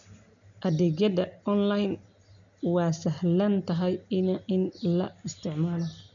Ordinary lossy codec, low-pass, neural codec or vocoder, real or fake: none; 7.2 kHz; none; real